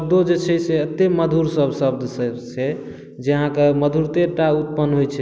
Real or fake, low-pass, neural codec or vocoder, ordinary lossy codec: real; none; none; none